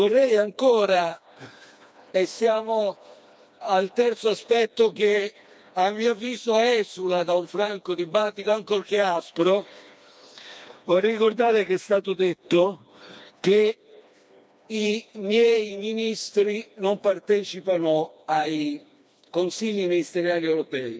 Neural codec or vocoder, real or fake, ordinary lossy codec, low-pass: codec, 16 kHz, 2 kbps, FreqCodec, smaller model; fake; none; none